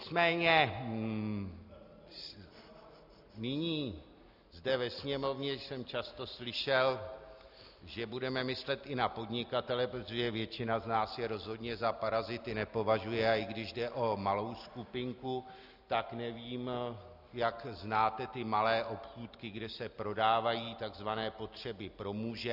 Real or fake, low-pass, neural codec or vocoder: real; 5.4 kHz; none